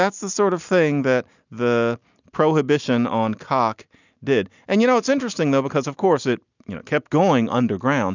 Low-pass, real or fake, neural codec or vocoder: 7.2 kHz; real; none